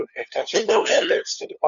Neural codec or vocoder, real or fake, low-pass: codec, 16 kHz, 2 kbps, FunCodec, trained on LibriTTS, 25 frames a second; fake; 7.2 kHz